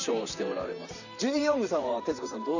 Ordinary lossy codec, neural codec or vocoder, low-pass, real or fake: none; vocoder, 44.1 kHz, 128 mel bands, Pupu-Vocoder; 7.2 kHz; fake